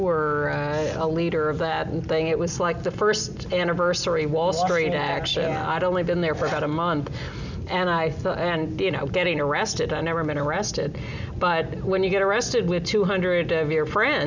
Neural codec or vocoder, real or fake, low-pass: none; real; 7.2 kHz